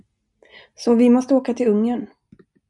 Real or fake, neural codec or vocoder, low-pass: real; none; 10.8 kHz